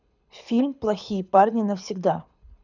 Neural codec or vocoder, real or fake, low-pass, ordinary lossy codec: codec, 24 kHz, 6 kbps, HILCodec; fake; 7.2 kHz; none